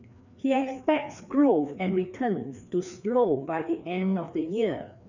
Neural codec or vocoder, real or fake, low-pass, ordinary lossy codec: codec, 16 kHz, 2 kbps, FreqCodec, larger model; fake; 7.2 kHz; none